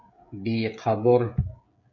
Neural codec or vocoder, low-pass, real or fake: codec, 16 kHz, 16 kbps, FreqCodec, smaller model; 7.2 kHz; fake